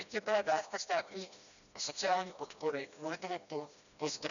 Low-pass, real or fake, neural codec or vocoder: 7.2 kHz; fake; codec, 16 kHz, 1 kbps, FreqCodec, smaller model